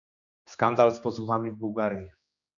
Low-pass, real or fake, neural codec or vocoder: 7.2 kHz; fake; codec, 16 kHz, 2 kbps, X-Codec, HuBERT features, trained on general audio